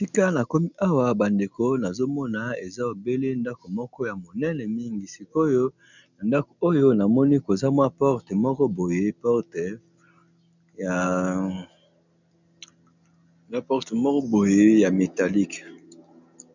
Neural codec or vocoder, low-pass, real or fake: none; 7.2 kHz; real